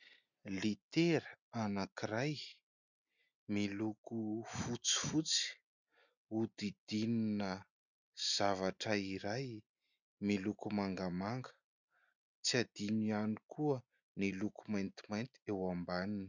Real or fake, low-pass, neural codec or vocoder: real; 7.2 kHz; none